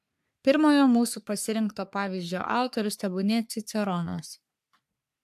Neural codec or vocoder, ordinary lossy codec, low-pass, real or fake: codec, 44.1 kHz, 3.4 kbps, Pupu-Codec; AAC, 96 kbps; 14.4 kHz; fake